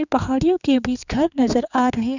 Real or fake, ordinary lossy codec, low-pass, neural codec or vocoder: fake; none; 7.2 kHz; codec, 16 kHz, 4 kbps, X-Codec, HuBERT features, trained on general audio